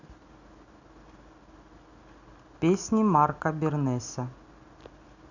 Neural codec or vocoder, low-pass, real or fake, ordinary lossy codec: none; 7.2 kHz; real; none